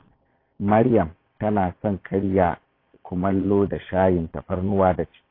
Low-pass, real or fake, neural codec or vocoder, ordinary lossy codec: 5.4 kHz; fake; vocoder, 22.05 kHz, 80 mel bands, Vocos; AAC, 24 kbps